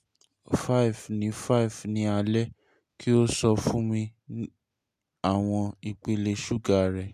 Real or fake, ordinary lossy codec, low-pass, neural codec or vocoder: real; AAC, 96 kbps; 14.4 kHz; none